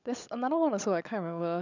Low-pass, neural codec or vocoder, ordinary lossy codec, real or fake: 7.2 kHz; codec, 16 kHz, 16 kbps, FunCodec, trained on LibriTTS, 50 frames a second; none; fake